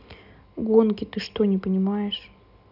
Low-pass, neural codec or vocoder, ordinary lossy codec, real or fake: 5.4 kHz; none; none; real